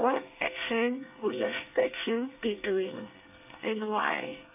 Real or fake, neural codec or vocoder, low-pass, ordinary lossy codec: fake; codec, 24 kHz, 1 kbps, SNAC; 3.6 kHz; none